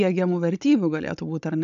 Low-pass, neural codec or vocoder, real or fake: 7.2 kHz; codec, 16 kHz, 16 kbps, FunCodec, trained on Chinese and English, 50 frames a second; fake